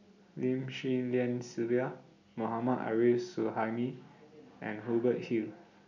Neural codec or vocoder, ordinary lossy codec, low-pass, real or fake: none; none; 7.2 kHz; real